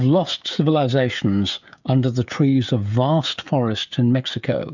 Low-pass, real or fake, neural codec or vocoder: 7.2 kHz; fake; codec, 16 kHz, 16 kbps, FreqCodec, smaller model